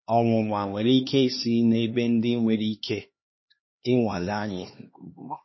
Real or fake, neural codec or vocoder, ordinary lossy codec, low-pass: fake; codec, 16 kHz, 2 kbps, X-Codec, HuBERT features, trained on LibriSpeech; MP3, 24 kbps; 7.2 kHz